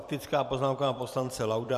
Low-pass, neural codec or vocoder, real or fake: 14.4 kHz; vocoder, 44.1 kHz, 128 mel bands every 256 samples, BigVGAN v2; fake